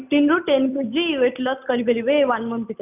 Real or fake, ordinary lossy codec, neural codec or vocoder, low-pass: real; none; none; 3.6 kHz